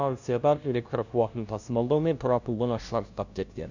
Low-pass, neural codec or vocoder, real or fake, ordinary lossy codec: 7.2 kHz; codec, 16 kHz, 0.5 kbps, FunCodec, trained on LibriTTS, 25 frames a second; fake; none